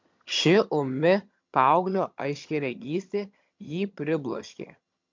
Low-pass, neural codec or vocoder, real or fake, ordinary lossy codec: 7.2 kHz; vocoder, 22.05 kHz, 80 mel bands, HiFi-GAN; fake; MP3, 64 kbps